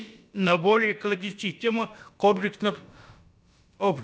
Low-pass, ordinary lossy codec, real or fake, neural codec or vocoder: none; none; fake; codec, 16 kHz, about 1 kbps, DyCAST, with the encoder's durations